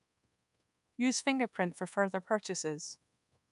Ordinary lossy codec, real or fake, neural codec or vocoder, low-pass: none; fake; codec, 24 kHz, 1.2 kbps, DualCodec; 10.8 kHz